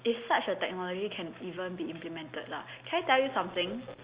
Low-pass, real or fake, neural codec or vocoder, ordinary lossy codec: 3.6 kHz; real; none; Opus, 64 kbps